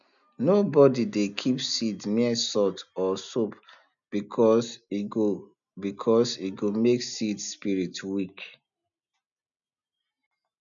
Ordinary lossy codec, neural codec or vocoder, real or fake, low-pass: none; none; real; 7.2 kHz